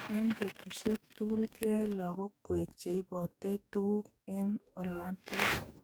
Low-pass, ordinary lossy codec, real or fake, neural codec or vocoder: none; none; fake; codec, 44.1 kHz, 2.6 kbps, DAC